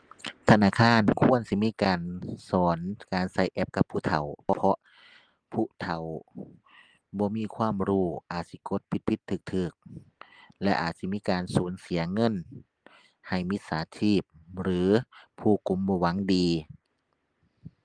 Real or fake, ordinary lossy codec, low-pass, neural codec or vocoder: real; Opus, 24 kbps; 9.9 kHz; none